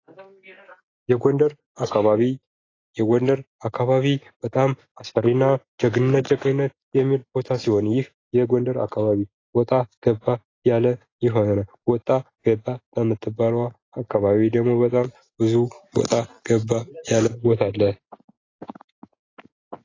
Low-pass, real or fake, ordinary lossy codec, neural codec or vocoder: 7.2 kHz; real; AAC, 32 kbps; none